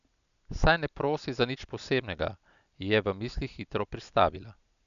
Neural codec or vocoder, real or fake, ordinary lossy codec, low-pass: none; real; none; 7.2 kHz